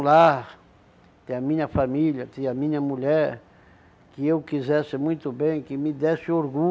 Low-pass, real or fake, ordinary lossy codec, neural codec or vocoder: none; real; none; none